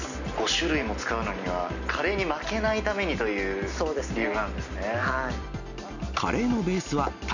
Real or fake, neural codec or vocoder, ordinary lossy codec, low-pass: real; none; none; 7.2 kHz